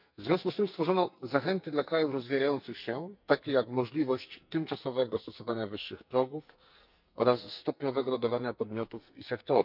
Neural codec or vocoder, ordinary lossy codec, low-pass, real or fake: codec, 44.1 kHz, 2.6 kbps, SNAC; none; 5.4 kHz; fake